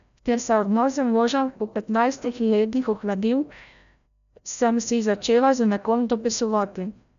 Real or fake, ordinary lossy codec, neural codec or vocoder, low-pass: fake; none; codec, 16 kHz, 0.5 kbps, FreqCodec, larger model; 7.2 kHz